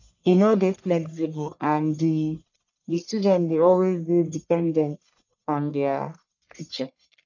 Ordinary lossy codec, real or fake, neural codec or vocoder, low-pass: none; fake; codec, 44.1 kHz, 1.7 kbps, Pupu-Codec; 7.2 kHz